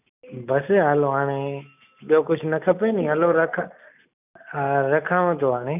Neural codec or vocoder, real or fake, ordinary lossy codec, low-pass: none; real; none; 3.6 kHz